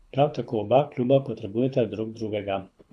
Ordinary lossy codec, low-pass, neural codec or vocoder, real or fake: none; none; codec, 24 kHz, 6 kbps, HILCodec; fake